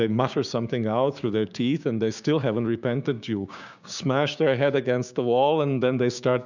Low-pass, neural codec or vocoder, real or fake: 7.2 kHz; codec, 16 kHz, 6 kbps, DAC; fake